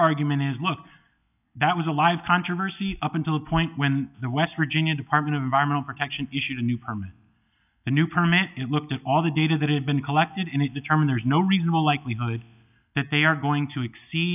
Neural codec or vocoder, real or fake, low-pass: none; real; 3.6 kHz